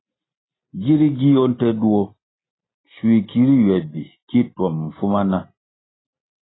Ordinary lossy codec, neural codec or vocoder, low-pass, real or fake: AAC, 16 kbps; none; 7.2 kHz; real